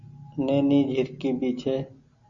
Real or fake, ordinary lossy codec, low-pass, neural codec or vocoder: real; Opus, 64 kbps; 7.2 kHz; none